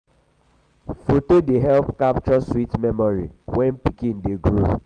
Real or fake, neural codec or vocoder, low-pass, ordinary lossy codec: real; none; 9.9 kHz; Opus, 32 kbps